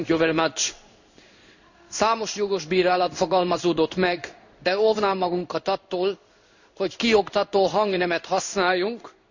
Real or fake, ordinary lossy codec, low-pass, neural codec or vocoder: fake; none; 7.2 kHz; codec, 16 kHz in and 24 kHz out, 1 kbps, XY-Tokenizer